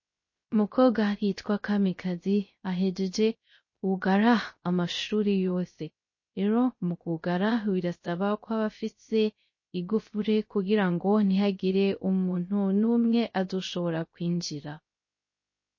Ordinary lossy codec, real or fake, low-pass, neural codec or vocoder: MP3, 32 kbps; fake; 7.2 kHz; codec, 16 kHz, 0.3 kbps, FocalCodec